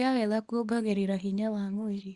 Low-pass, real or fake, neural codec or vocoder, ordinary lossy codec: 10.8 kHz; fake; codec, 24 kHz, 0.9 kbps, WavTokenizer, small release; Opus, 64 kbps